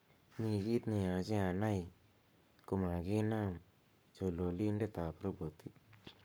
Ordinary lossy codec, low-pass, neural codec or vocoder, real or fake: none; none; codec, 44.1 kHz, 7.8 kbps, Pupu-Codec; fake